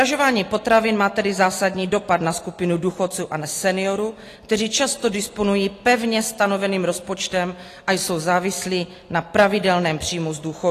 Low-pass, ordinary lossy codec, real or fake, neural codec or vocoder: 14.4 kHz; AAC, 48 kbps; real; none